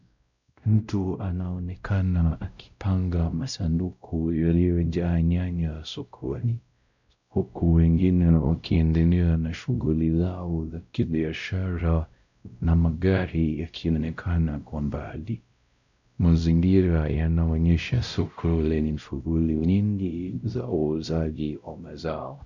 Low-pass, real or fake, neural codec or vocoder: 7.2 kHz; fake; codec, 16 kHz, 0.5 kbps, X-Codec, WavLM features, trained on Multilingual LibriSpeech